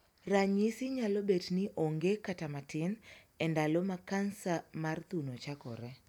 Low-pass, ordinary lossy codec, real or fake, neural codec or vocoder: 19.8 kHz; none; real; none